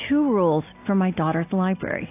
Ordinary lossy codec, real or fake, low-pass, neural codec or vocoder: AAC, 24 kbps; real; 3.6 kHz; none